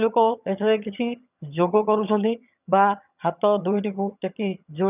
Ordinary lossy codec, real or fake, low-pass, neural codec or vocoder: none; fake; 3.6 kHz; vocoder, 22.05 kHz, 80 mel bands, HiFi-GAN